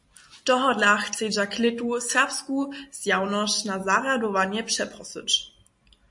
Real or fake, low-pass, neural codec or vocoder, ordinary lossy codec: real; 10.8 kHz; none; MP3, 48 kbps